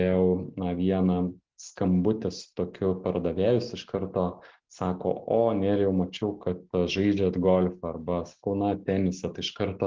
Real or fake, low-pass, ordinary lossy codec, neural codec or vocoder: real; 7.2 kHz; Opus, 16 kbps; none